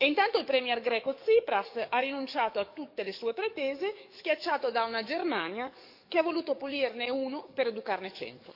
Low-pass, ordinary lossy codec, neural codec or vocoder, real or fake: 5.4 kHz; none; codec, 44.1 kHz, 7.8 kbps, DAC; fake